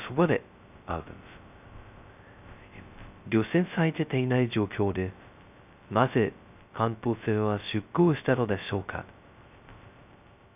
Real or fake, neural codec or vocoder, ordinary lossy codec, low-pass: fake; codec, 16 kHz, 0.2 kbps, FocalCodec; none; 3.6 kHz